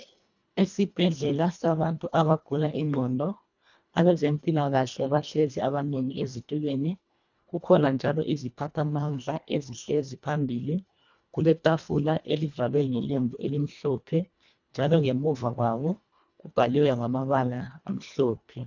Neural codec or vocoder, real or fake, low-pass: codec, 24 kHz, 1.5 kbps, HILCodec; fake; 7.2 kHz